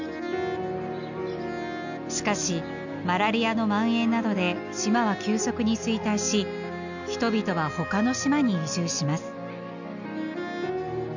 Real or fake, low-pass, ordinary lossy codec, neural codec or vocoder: real; 7.2 kHz; none; none